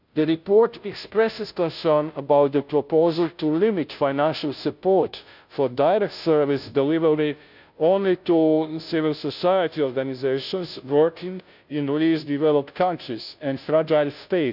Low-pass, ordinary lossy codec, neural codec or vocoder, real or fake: 5.4 kHz; none; codec, 16 kHz, 0.5 kbps, FunCodec, trained on Chinese and English, 25 frames a second; fake